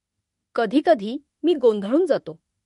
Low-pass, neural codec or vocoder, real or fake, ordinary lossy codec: 14.4 kHz; autoencoder, 48 kHz, 32 numbers a frame, DAC-VAE, trained on Japanese speech; fake; MP3, 48 kbps